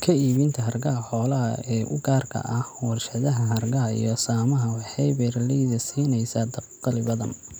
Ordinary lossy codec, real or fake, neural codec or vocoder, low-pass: none; real; none; none